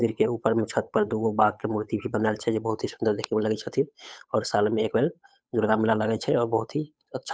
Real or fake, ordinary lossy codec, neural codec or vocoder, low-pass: fake; none; codec, 16 kHz, 8 kbps, FunCodec, trained on Chinese and English, 25 frames a second; none